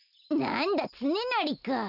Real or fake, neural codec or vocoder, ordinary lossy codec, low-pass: real; none; none; 5.4 kHz